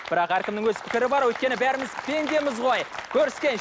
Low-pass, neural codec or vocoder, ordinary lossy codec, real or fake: none; none; none; real